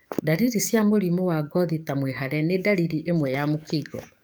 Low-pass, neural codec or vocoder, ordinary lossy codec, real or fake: none; codec, 44.1 kHz, 7.8 kbps, DAC; none; fake